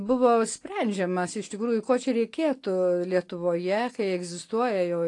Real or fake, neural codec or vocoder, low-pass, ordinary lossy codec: real; none; 10.8 kHz; AAC, 48 kbps